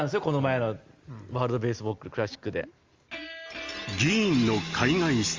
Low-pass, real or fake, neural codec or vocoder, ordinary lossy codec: 7.2 kHz; real; none; Opus, 32 kbps